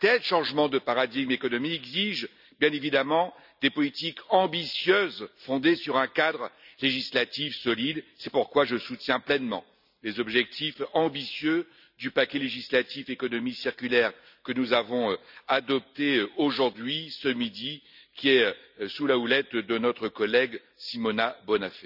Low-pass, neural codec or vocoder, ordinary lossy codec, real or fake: 5.4 kHz; none; none; real